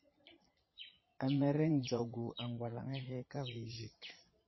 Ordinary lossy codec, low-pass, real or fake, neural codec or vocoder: MP3, 24 kbps; 7.2 kHz; fake; vocoder, 22.05 kHz, 80 mel bands, Vocos